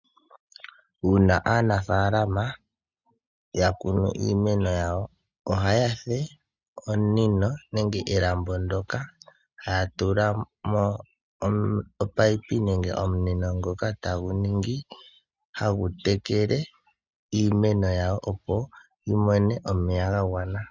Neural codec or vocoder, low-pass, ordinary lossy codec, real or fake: none; 7.2 kHz; Opus, 64 kbps; real